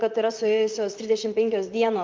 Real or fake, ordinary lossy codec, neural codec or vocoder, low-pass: fake; Opus, 32 kbps; vocoder, 44.1 kHz, 128 mel bands, Pupu-Vocoder; 7.2 kHz